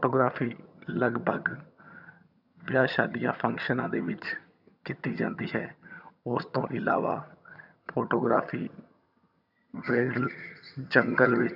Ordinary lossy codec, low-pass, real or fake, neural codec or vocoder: none; 5.4 kHz; fake; vocoder, 22.05 kHz, 80 mel bands, HiFi-GAN